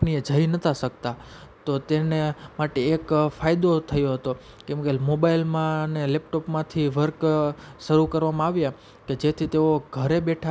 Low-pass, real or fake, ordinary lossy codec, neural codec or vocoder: none; real; none; none